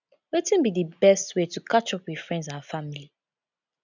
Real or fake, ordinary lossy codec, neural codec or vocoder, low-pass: real; none; none; 7.2 kHz